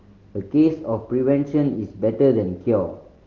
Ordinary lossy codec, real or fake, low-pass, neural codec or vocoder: Opus, 16 kbps; real; 7.2 kHz; none